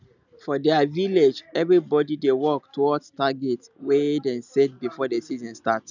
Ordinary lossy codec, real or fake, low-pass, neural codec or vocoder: none; real; 7.2 kHz; none